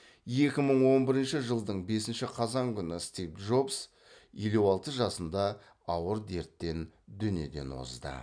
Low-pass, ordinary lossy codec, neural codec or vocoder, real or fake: 9.9 kHz; none; none; real